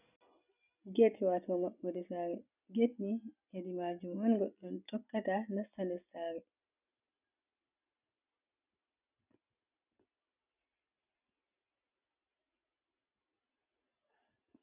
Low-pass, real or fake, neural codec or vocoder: 3.6 kHz; real; none